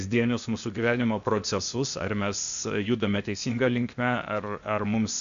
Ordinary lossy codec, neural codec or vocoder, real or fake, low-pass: AAC, 96 kbps; codec, 16 kHz, 0.8 kbps, ZipCodec; fake; 7.2 kHz